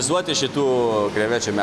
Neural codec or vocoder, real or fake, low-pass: none; real; 14.4 kHz